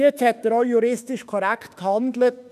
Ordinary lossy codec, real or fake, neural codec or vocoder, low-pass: none; fake; autoencoder, 48 kHz, 32 numbers a frame, DAC-VAE, trained on Japanese speech; 14.4 kHz